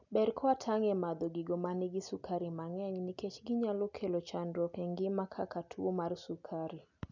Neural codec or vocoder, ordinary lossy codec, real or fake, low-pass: none; none; real; 7.2 kHz